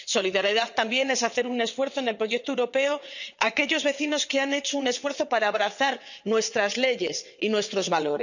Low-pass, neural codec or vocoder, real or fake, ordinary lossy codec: 7.2 kHz; vocoder, 22.05 kHz, 80 mel bands, WaveNeXt; fake; none